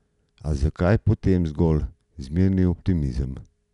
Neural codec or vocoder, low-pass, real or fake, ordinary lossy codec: none; 10.8 kHz; real; none